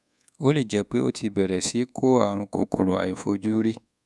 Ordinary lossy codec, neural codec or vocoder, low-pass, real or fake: none; codec, 24 kHz, 1.2 kbps, DualCodec; none; fake